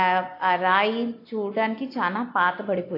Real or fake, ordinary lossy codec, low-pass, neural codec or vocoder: real; AAC, 32 kbps; 5.4 kHz; none